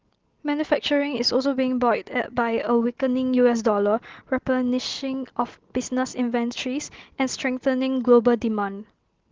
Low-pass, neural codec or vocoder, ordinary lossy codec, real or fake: 7.2 kHz; none; Opus, 16 kbps; real